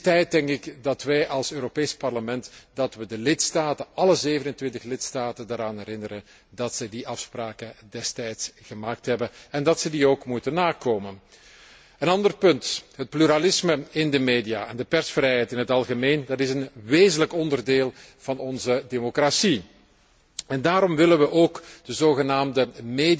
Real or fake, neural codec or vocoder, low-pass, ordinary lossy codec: real; none; none; none